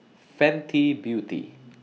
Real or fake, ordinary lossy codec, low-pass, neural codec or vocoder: real; none; none; none